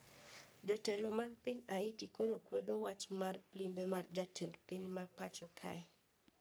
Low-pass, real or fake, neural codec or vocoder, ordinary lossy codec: none; fake; codec, 44.1 kHz, 3.4 kbps, Pupu-Codec; none